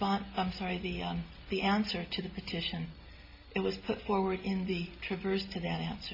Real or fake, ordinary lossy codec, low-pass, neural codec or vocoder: real; MP3, 32 kbps; 5.4 kHz; none